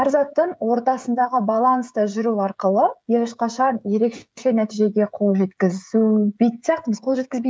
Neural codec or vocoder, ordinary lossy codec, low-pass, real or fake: codec, 16 kHz, 16 kbps, FreqCodec, smaller model; none; none; fake